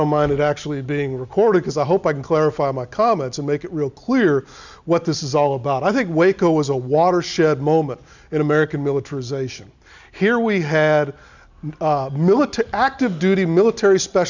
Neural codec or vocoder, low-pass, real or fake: none; 7.2 kHz; real